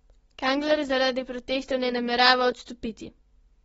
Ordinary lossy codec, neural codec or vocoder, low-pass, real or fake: AAC, 24 kbps; none; 19.8 kHz; real